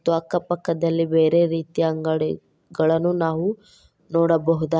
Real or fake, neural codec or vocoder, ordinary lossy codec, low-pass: real; none; none; none